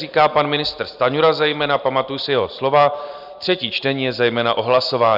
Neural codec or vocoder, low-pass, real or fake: none; 5.4 kHz; real